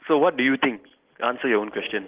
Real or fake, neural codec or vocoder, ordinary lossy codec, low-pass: real; none; Opus, 16 kbps; 3.6 kHz